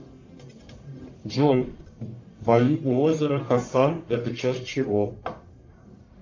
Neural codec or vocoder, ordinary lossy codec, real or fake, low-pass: codec, 44.1 kHz, 1.7 kbps, Pupu-Codec; AAC, 48 kbps; fake; 7.2 kHz